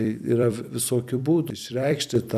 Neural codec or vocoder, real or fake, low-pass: vocoder, 44.1 kHz, 128 mel bands every 256 samples, BigVGAN v2; fake; 14.4 kHz